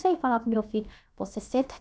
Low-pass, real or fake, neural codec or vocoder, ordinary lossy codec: none; fake; codec, 16 kHz, about 1 kbps, DyCAST, with the encoder's durations; none